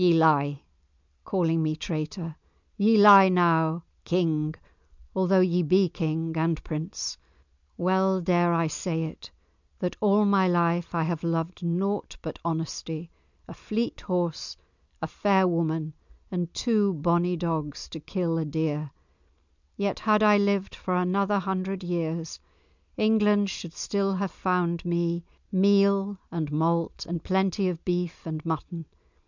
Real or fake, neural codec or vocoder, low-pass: real; none; 7.2 kHz